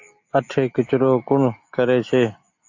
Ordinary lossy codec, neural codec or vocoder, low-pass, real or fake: AAC, 48 kbps; none; 7.2 kHz; real